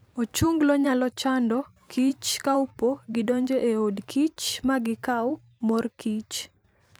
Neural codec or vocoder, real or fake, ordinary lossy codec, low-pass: vocoder, 44.1 kHz, 128 mel bands every 512 samples, BigVGAN v2; fake; none; none